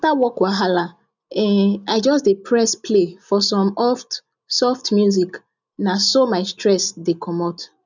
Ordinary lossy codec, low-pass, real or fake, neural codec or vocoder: none; 7.2 kHz; real; none